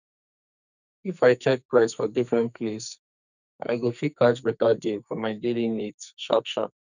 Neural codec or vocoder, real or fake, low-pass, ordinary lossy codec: codec, 44.1 kHz, 2.6 kbps, SNAC; fake; 7.2 kHz; none